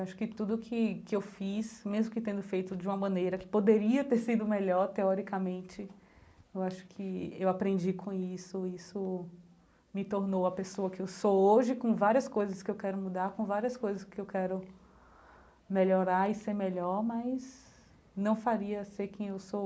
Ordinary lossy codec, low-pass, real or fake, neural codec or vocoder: none; none; real; none